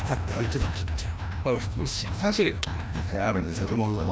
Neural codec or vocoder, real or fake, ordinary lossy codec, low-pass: codec, 16 kHz, 1 kbps, FreqCodec, larger model; fake; none; none